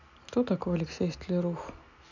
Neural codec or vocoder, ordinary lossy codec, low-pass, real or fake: none; none; 7.2 kHz; real